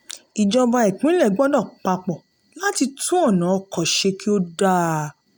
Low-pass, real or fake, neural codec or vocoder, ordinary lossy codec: none; real; none; none